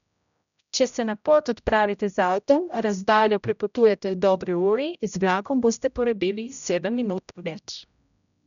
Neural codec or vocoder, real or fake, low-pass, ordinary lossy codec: codec, 16 kHz, 0.5 kbps, X-Codec, HuBERT features, trained on general audio; fake; 7.2 kHz; none